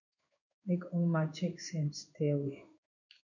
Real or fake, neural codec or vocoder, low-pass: fake; codec, 16 kHz in and 24 kHz out, 1 kbps, XY-Tokenizer; 7.2 kHz